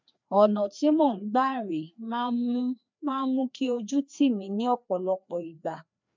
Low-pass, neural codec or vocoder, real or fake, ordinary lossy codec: 7.2 kHz; codec, 16 kHz, 2 kbps, FreqCodec, larger model; fake; MP3, 64 kbps